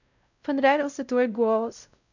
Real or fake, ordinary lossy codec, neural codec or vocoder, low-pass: fake; none; codec, 16 kHz, 0.5 kbps, X-Codec, WavLM features, trained on Multilingual LibriSpeech; 7.2 kHz